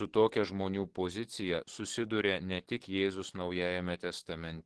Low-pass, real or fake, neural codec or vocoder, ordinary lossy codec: 10.8 kHz; fake; codec, 44.1 kHz, 7.8 kbps, DAC; Opus, 16 kbps